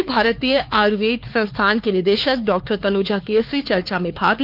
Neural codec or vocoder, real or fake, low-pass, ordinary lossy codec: codec, 16 kHz, 4 kbps, X-Codec, HuBERT features, trained on LibriSpeech; fake; 5.4 kHz; Opus, 32 kbps